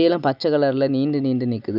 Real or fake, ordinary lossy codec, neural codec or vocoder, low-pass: real; none; none; 5.4 kHz